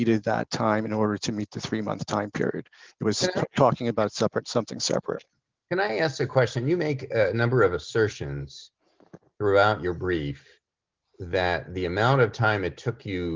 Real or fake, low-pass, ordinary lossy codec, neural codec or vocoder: real; 7.2 kHz; Opus, 32 kbps; none